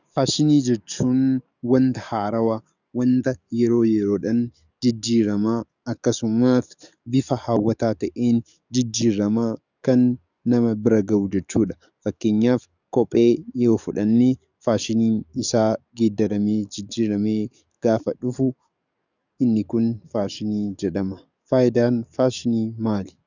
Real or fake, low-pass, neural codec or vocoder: fake; 7.2 kHz; codec, 44.1 kHz, 7.8 kbps, DAC